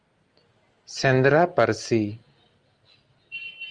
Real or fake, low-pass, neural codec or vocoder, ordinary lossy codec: real; 9.9 kHz; none; Opus, 32 kbps